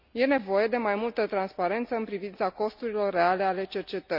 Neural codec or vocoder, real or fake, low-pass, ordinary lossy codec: none; real; 5.4 kHz; none